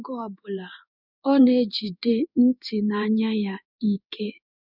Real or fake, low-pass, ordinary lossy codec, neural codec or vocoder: fake; 5.4 kHz; none; codec, 16 kHz in and 24 kHz out, 1 kbps, XY-Tokenizer